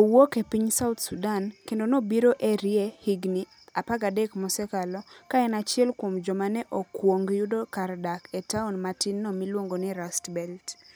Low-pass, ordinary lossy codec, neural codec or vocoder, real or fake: none; none; none; real